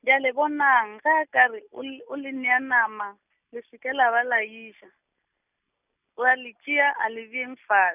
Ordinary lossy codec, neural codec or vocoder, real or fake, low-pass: none; none; real; 3.6 kHz